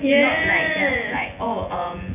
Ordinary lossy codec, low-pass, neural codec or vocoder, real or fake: none; 3.6 kHz; vocoder, 24 kHz, 100 mel bands, Vocos; fake